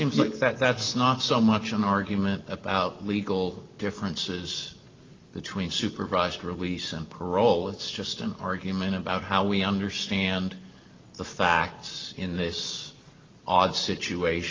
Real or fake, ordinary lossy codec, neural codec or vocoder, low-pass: real; Opus, 24 kbps; none; 7.2 kHz